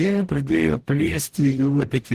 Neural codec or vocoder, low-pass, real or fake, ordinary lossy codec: codec, 44.1 kHz, 0.9 kbps, DAC; 14.4 kHz; fake; Opus, 16 kbps